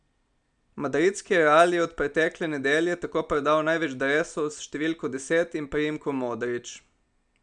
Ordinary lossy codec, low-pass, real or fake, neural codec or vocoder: none; 9.9 kHz; real; none